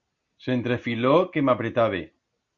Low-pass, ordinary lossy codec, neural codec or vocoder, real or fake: 7.2 kHz; Opus, 64 kbps; none; real